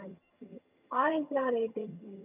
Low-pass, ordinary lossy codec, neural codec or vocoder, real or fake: 3.6 kHz; none; vocoder, 22.05 kHz, 80 mel bands, HiFi-GAN; fake